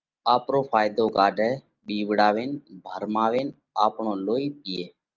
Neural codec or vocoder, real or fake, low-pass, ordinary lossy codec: none; real; 7.2 kHz; Opus, 32 kbps